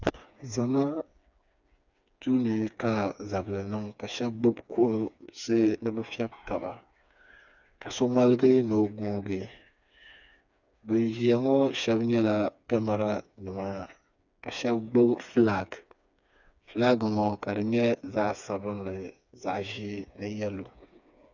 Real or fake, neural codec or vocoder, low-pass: fake; codec, 16 kHz, 4 kbps, FreqCodec, smaller model; 7.2 kHz